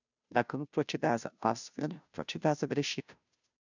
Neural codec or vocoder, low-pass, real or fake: codec, 16 kHz, 0.5 kbps, FunCodec, trained on Chinese and English, 25 frames a second; 7.2 kHz; fake